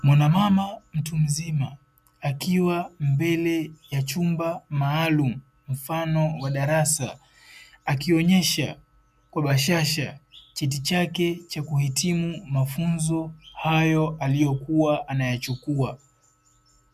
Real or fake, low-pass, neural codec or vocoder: real; 14.4 kHz; none